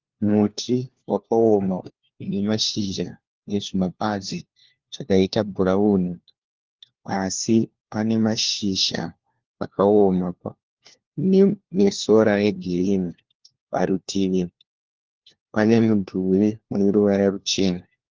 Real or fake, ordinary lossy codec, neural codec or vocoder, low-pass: fake; Opus, 16 kbps; codec, 16 kHz, 1 kbps, FunCodec, trained on LibriTTS, 50 frames a second; 7.2 kHz